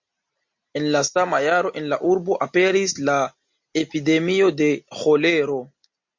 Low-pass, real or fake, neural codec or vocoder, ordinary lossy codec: 7.2 kHz; real; none; MP3, 48 kbps